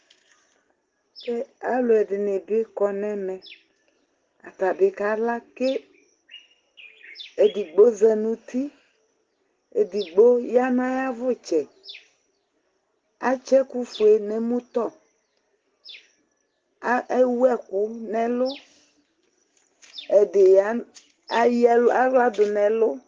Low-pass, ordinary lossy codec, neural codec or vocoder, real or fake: 7.2 kHz; Opus, 16 kbps; none; real